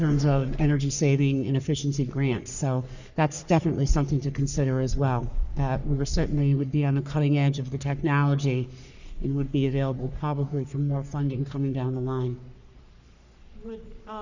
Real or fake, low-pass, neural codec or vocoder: fake; 7.2 kHz; codec, 44.1 kHz, 3.4 kbps, Pupu-Codec